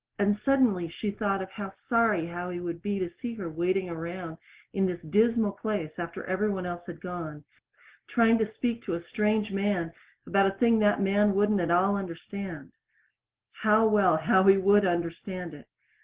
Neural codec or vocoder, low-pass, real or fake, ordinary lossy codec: none; 3.6 kHz; real; Opus, 16 kbps